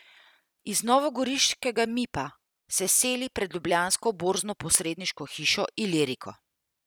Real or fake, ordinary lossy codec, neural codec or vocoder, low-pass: real; none; none; none